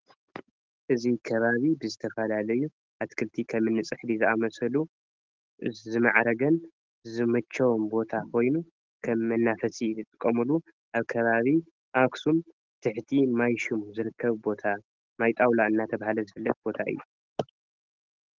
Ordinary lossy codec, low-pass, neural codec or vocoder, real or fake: Opus, 16 kbps; 7.2 kHz; none; real